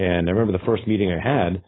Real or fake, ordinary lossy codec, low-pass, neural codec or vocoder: fake; AAC, 16 kbps; 7.2 kHz; codec, 16 kHz, 4.8 kbps, FACodec